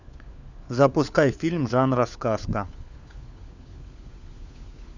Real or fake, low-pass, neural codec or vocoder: fake; 7.2 kHz; codec, 16 kHz, 4 kbps, FunCodec, trained on LibriTTS, 50 frames a second